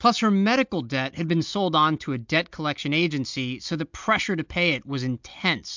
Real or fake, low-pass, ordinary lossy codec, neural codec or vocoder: real; 7.2 kHz; MP3, 64 kbps; none